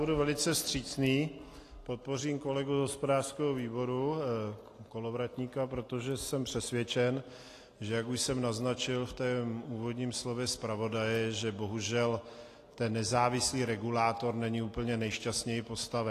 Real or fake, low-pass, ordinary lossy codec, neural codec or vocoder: real; 14.4 kHz; AAC, 48 kbps; none